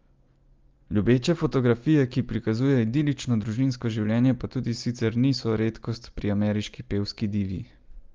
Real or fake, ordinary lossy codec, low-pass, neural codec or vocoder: real; Opus, 32 kbps; 7.2 kHz; none